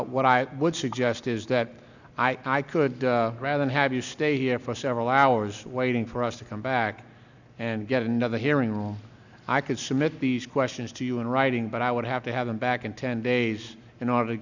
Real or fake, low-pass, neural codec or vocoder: real; 7.2 kHz; none